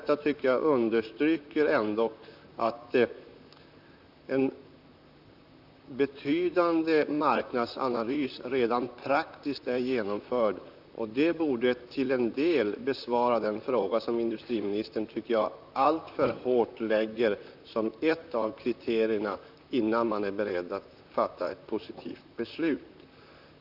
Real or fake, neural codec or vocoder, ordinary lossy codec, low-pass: fake; vocoder, 44.1 kHz, 128 mel bands, Pupu-Vocoder; none; 5.4 kHz